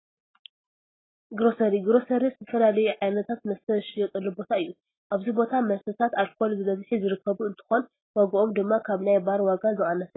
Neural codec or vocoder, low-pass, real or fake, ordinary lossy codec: none; 7.2 kHz; real; AAC, 16 kbps